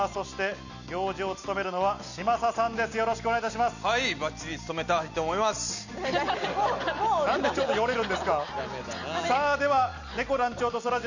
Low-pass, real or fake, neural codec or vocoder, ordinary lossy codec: 7.2 kHz; real; none; none